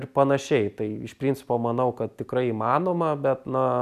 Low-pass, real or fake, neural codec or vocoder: 14.4 kHz; real; none